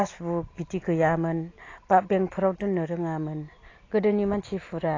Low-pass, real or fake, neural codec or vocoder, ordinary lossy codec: 7.2 kHz; real; none; AAC, 32 kbps